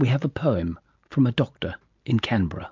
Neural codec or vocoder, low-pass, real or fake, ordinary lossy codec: none; 7.2 kHz; real; MP3, 64 kbps